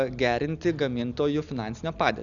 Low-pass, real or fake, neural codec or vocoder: 7.2 kHz; real; none